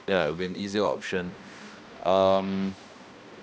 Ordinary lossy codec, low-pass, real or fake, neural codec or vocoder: none; none; fake; codec, 16 kHz, 1 kbps, X-Codec, HuBERT features, trained on balanced general audio